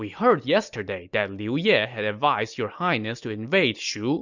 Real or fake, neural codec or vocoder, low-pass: real; none; 7.2 kHz